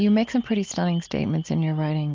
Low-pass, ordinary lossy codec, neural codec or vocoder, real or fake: 7.2 kHz; Opus, 32 kbps; none; real